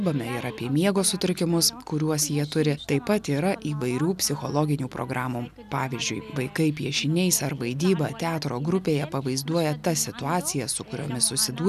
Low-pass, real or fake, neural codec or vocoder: 14.4 kHz; real; none